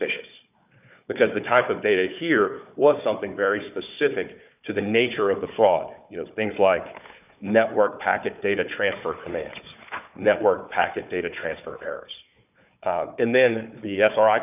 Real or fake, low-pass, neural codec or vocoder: fake; 3.6 kHz; codec, 16 kHz, 4 kbps, FunCodec, trained on Chinese and English, 50 frames a second